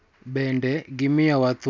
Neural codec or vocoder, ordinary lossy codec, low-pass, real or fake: none; none; none; real